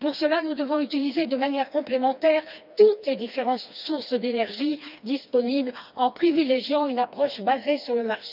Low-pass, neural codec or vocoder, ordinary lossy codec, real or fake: 5.4 kHz; codec, 16 kHz, 2 kbps, FreqCodec, smaller model; none; fake